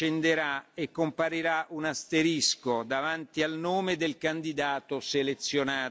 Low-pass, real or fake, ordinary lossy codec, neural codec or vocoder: none; real; none; none